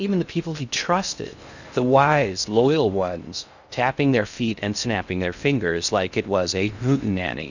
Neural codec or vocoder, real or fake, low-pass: codec, 16 kHz in and 24 kHz out, 0.6 kbps, FocalCodec, streaming, 2048 codes; fake; 7.2 kHz